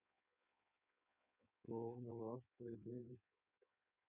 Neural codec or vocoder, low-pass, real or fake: codec, 16 kHz in and 24 kHz out, 1.1 kbps, FireRedTTS-2 codec; 3.6 kHz; fake